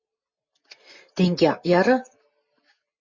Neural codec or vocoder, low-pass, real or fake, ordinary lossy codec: none; 7.2 kHz; real; MP3, 32 kbps